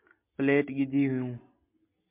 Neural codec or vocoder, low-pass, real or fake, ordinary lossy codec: none; 3.6 kHz; real; MP3, 32 kbps